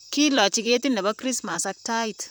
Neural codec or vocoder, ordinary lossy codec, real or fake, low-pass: vocoder, 44.1 kHz, 128 mel bands, Pupu-Vocoder; none; fake; none